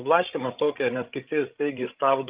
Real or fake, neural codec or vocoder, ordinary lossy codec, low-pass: fake; codec, 16 kHz, 16 kbps, FreqCodec, larger model; Opus, 32 kbps; 3.6 kHz